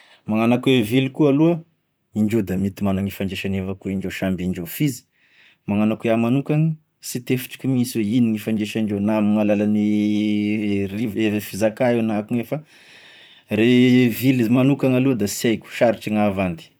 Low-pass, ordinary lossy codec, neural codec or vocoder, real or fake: none; none; vocoder, 44.1 kHz, 128 mel bands, Pupu-Vocoder; fake